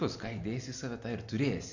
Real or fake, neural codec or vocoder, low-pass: real; none; 7.2 kHz